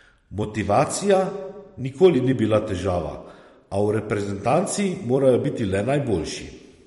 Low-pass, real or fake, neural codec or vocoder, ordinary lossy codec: 19.8 kHz; fake; vocoder, 44.1 kHz, 128 mel bands every 256 samples, BigVGAN v2; MP3, 48 kbps